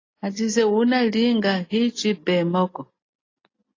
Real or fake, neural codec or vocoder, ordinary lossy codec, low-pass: real; none; AAC, 32 kbps; 7.2 kHz